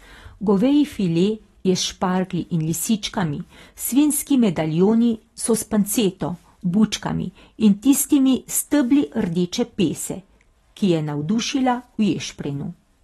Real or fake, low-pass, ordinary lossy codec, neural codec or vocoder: real; 19.8 kHz; AAC, 32 kbps; none